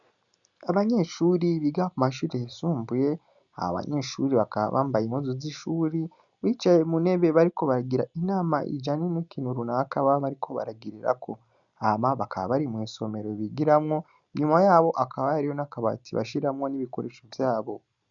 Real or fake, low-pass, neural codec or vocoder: real; 7.2 kHz; none